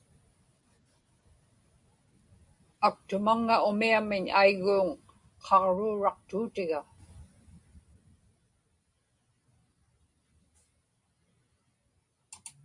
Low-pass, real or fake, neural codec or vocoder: 10.8 kHz; real; none